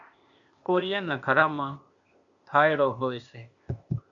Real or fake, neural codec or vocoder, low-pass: fake; codec, 16 kHz, 0.8 kbps, ZipCodec; 7.2 kHz